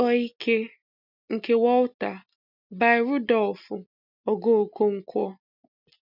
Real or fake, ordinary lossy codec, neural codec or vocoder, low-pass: real; none; none; 5.4 kHz